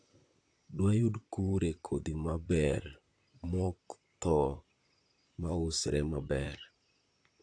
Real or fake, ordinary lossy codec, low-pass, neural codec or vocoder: fake; AAC, 48 kbps; 9.9 kHz; vocoder, 44.1 kHz, 128 mel bands, Pupu-Vocoder